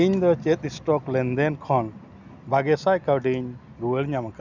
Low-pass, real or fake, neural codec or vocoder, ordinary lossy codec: 7.2 kHz; real; none; none